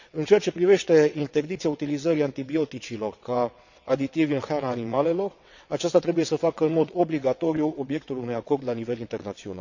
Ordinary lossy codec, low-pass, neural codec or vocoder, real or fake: none; 7.2 kHz; vocoder, 22.05 kHz, 80 mel bands, WaveNeXt; fake